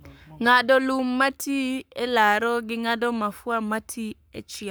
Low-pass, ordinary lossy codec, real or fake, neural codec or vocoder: none; none; fake; codec, 44.1 kHz, 7.8 kbps, Pupu-Codec